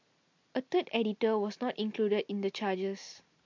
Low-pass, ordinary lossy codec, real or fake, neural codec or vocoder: 7.2 kHz; MP3, 48 kbps; real; none